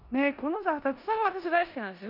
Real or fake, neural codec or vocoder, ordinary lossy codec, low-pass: fake; codec, 16 kHz in and 24 kHz out, 0.9 kbps, LongCat-Audio-Codec, four codebook decoder; none; 5.4 kHz